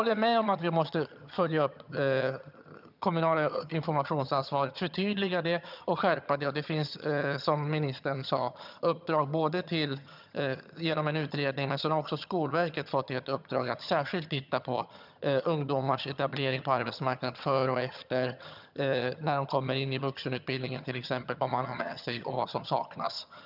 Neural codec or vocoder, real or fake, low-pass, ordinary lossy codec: vocoder, 22.05 kHz, 80 mel bands, HiFi-GAN; fake; 5.4 kHz; none